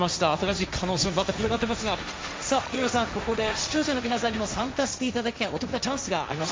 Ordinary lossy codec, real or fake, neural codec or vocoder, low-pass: none; fake; codec, 16 kHz, 1.1 kbps, Voila-Tokenizer; none